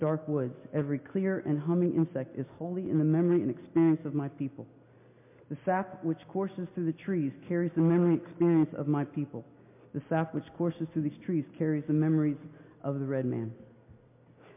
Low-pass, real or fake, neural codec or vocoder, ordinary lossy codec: 3.6 kHz; real; none; MP3, 24 kbps